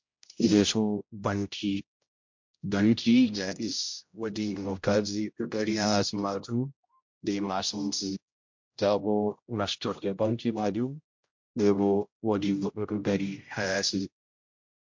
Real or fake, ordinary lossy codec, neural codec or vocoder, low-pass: fake; MP3, 48 kbps; codec, 16 kHz, 0.5 kbps, X-Codec, HuBERT features, trained on general audio; 7.2 kHz